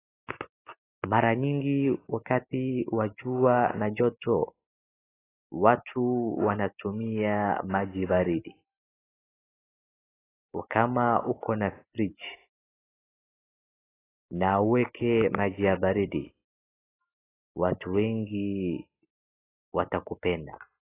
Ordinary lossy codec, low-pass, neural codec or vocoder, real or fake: AAC, 16 kbps; 3.6 kHz; none; real